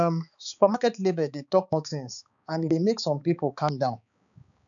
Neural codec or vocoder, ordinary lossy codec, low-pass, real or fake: codec, 16 kHz, 4 kbps, X-Codec, HuBERT features, trained on balanced general audio; none; 7.2 kHz; fake